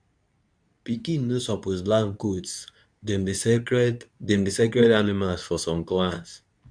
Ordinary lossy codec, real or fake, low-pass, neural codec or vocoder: none; fake; 9.9 kHz; codec, 24 kHz, 0.9 kbps, WavTokenizer, medium speech release version 2